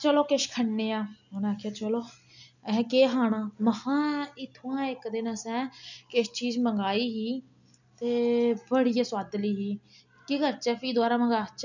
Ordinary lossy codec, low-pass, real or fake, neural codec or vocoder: none; 7.2 kHz; real; none